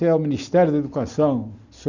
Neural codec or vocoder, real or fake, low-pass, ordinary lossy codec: none; real; 7.2 kHz; none